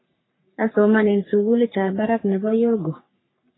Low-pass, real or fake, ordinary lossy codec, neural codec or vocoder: 7.2 kHz; fake; AAC, 16 kbps; codec, 44.1 kHz, 3.4 kbps, Pupu-Codec